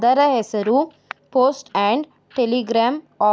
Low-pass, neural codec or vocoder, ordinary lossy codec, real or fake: none; none; none; real